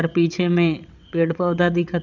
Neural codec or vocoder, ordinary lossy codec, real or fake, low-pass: vocoder, 22.05 kHz, 80 mel bands, WaveNeXt; none; fake; 7.2 kHz